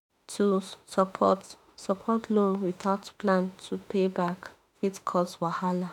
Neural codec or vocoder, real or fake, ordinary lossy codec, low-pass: autoencoder, 48 kHz, 32 numbers a frame, DAC-VAE, trained on Japanese speech; fake; none; 19.8 kHz